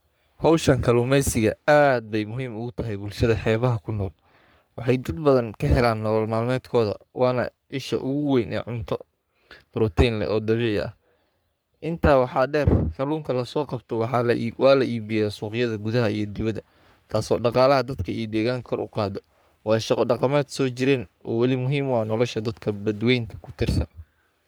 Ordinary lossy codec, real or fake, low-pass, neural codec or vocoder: none; fake; none; codec, 44.1 kHz, 3.4 kbps, Pupu-Codec